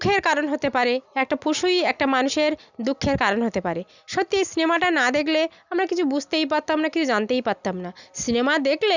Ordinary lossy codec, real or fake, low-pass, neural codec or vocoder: none; real; 7.2 kHz; none